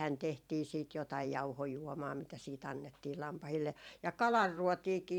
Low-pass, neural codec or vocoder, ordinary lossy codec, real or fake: 19.8 kHz; vocoder, 44.1 kHz, 128 mel bands every 512 samples, BigVGAN v2; none; fake